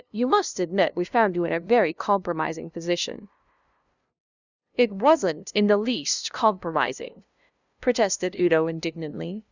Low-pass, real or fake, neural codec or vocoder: 7.2 kHz; fake; codec, 16 kHz, 0.5 kbps, FunCodec, trained on LibriTTS, 25 frames a second